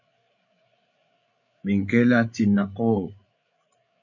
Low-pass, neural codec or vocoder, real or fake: 7.2 kHz; codec, 16 kHz, 8 kbps, FreqCodec, larger model; fake